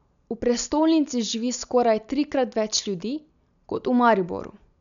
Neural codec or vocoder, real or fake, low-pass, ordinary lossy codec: none; real; 7.2 kHz; none